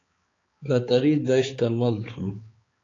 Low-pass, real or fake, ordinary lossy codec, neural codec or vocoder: 7.2 kHz; fake; AAC, 32 kbps; codec, 16 kHz, 4 kbps, X-Codec, HuBERT features, trained on balanced general audio